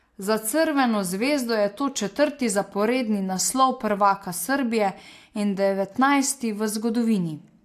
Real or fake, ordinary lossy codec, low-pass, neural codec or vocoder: real; AAC, 64 kbps; 14.4 kHz; none